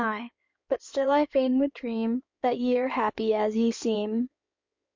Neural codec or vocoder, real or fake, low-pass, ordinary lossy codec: codec, 16 kHz in and 24 kHz out, 2.2 kbps, FireRedTTS-2 codec; fake; 7.2 kHz; MP3, 48 kbps